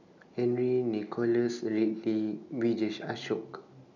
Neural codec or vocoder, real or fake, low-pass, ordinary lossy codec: none; real; 7.2 kHz; none